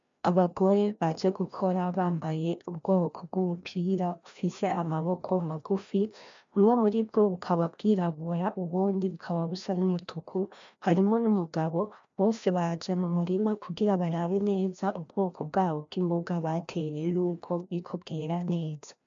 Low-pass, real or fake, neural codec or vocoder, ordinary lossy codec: 7.2 kHz; fake; codec, 16 kHz, 1 kbps, FreqCodec, larger model; MP3, 64 kbps